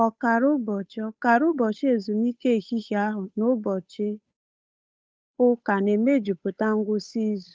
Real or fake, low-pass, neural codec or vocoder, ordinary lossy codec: fake; none; codec, 16 kHz, 8 kbps, FunCodec, trained on Chinese and English, 25 frames a second; none